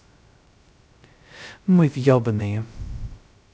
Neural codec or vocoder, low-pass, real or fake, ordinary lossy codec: codec, 16 kHz, 0.2 kbps, FocalCodec; none; fake; none